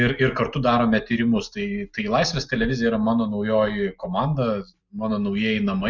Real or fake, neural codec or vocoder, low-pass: real; none; 7.2 kHz